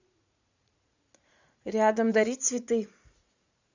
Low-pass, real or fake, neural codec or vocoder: 7.2 kHz; fake; vocoder, 44.1 kHz, 80 mel bands, Vocos